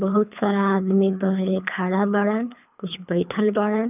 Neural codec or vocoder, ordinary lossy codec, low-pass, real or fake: codec, 24 kHz, 3 kbps, HILCodec; none; 3.6 kHz; fake